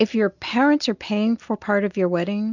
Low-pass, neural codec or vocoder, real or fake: 7.2 kHz; none; real